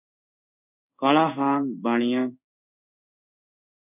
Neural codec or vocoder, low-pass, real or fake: codec, 16 kHz in and 24 kHz out, 1 kbps, XY-Tokenizer; 3.6 kHz; fake